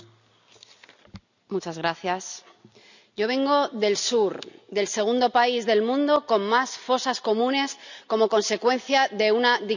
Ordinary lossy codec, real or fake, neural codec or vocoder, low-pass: none; real; none; 7.2 kHz